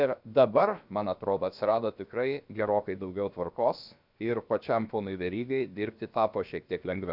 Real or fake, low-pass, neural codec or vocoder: fake; 5.4 kHz; codec, 16 kHz, about 1 kbps, DyCAST, with the encoder's durations